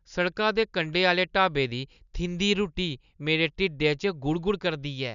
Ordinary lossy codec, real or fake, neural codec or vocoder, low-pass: none; real; none; 7.2 kHz